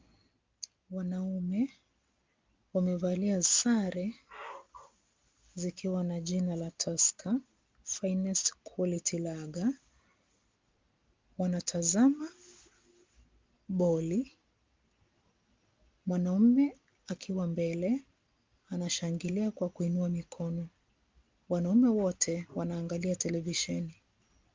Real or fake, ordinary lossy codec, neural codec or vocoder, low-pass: real; Opus, 32 kbps; none; 7.2 kHz